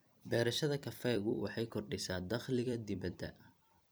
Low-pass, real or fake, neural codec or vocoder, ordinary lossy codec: none; fake; vocoder, 44.1 kHz, 128 mel bands every 256 samples, BigVGAN v2; none